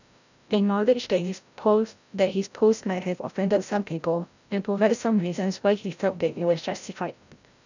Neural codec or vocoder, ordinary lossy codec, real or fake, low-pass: codec, 16 kHz, 0.5 kbps, FreqCodec, larger model; none; fake; 7.2 kHz